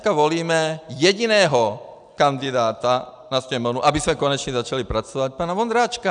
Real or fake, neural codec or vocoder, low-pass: real; none; 9.9 kHz